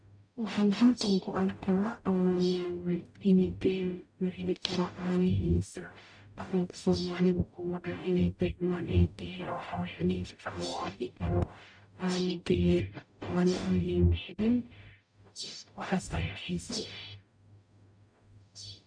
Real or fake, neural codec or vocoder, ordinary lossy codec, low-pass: fake; codec, 44.1 kHz, 0.9 kbps, DAC; none; 9.9 kHz